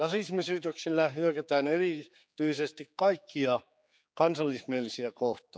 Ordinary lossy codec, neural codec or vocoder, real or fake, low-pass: none; codec, 16 kHz, 4 kbps, X-Codec, HuBERT features, trained on general audio; fake; none